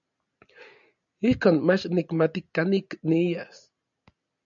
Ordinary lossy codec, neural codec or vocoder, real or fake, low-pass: MP3, 96 kbps; none; real; 7.2 kHz